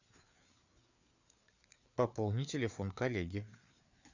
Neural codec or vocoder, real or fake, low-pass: codec, 16 kHz, 4 kbps, FreqCodec, larger model; fake; 7.2 kHz